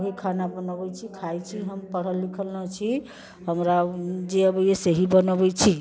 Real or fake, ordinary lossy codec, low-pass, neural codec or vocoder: real; none; none; none